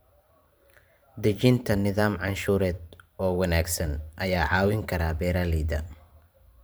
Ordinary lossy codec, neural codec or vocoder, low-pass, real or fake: none; vocoder, 44.1 kHz, 128 mel bands every 512 samples, BigVGAN v2; none; fake